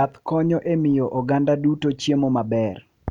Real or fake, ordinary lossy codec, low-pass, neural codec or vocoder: real; none; 19.8 kHz; none